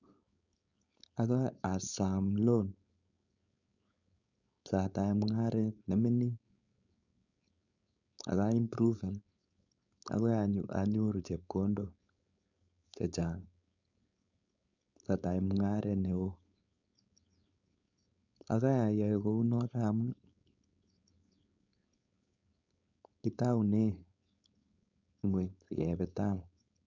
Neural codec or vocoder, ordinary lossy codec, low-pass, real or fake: codec, 16 kHz, 4.8 kbps, FACodec; none; 7.2 kHz; fake